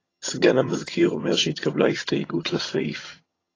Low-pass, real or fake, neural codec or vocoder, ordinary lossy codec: 7.2 kHz; fake; vocoder, 22.05 kHz, 80 mel bands, HiFi-GAN; AAC, 32 kbps